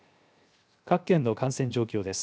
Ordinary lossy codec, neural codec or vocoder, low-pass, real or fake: none; codec, 16 kHz, 0.7 kbps, FocalCodec; none; fake